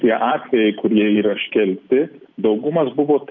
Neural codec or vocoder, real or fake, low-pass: none; real; 7.2 kHz